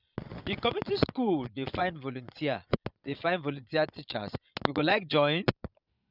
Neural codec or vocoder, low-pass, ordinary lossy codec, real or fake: vocoder, 22.05 kHz, 80 mel bands, Vocos; 5.4 kHz; none; fake